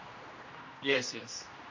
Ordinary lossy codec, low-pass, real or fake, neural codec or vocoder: MP3, 32 kbps; 7.2 kHz; fake; codec, 16 kHz, 1 kbps, X-Codec, HuBERT features, trained on general audio